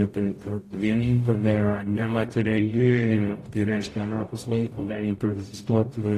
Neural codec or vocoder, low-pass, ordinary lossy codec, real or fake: codec, 44.1 kHz, 0.9 kbps, DAC; 14.4 kHz; AAC, 48 kbps; fake